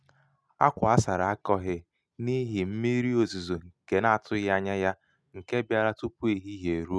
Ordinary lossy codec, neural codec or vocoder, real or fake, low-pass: none; none; real; none